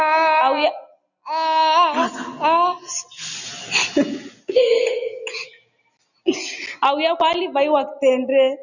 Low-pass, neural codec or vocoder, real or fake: 7.2 kHz; none; real